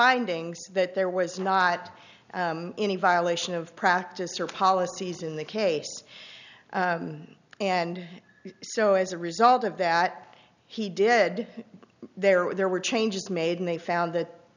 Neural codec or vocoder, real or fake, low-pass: none; real; 7.2 kHz